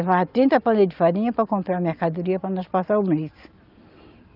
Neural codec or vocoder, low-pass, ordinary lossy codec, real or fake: none; 5.4 kHz; Opus, 32 kbps; real